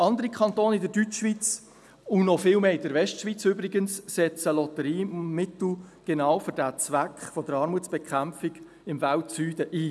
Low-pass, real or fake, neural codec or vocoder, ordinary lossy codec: none; real; none; none